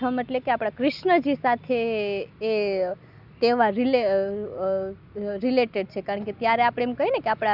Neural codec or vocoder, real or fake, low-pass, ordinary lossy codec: none; real; 5.4 kHz; Opus, 64 kbps